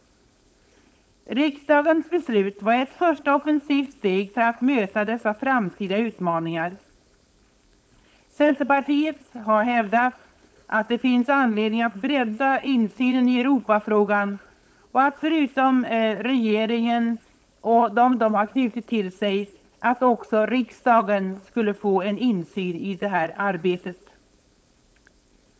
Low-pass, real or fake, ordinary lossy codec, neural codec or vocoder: none; fake; none; codec, 16 kHz, 4.8 kbps, FACodec